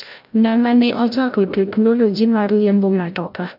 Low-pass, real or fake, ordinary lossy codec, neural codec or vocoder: 5.4 kHz; fake; none; codec, 16 kHz, 0.5 kbps, FreqCodec, larger model